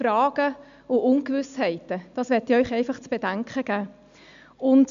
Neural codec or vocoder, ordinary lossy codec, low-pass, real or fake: none; MP3, 64 kbps; 7.2 kHz; real